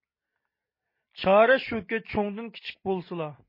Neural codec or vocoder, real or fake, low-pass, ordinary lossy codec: none; real; 5.4 kHz; MP3, 24 kbps